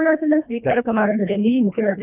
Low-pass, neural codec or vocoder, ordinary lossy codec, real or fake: 3.6 kHz; codec, 24 kHz, 1.5 kbps, HILCodec; none; fake